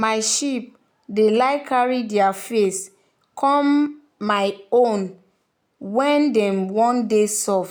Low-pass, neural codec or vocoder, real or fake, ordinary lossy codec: none; none; real; none